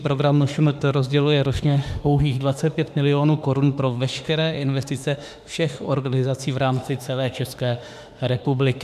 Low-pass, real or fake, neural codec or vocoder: 14.4 kHz; fake; autoencoder, 48 kHz, 32 numbers a frame, DAC-VAE, trained on Japanese speech